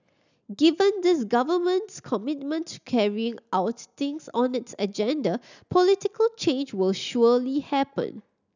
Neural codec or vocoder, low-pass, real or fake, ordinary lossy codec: none; 7.2 kHz; real; none